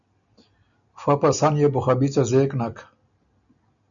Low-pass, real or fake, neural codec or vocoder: 7.2 kHz; real; none